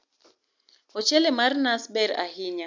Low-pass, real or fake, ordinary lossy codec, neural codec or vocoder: 7.2 kHz; real; MP3, 64 kbps; none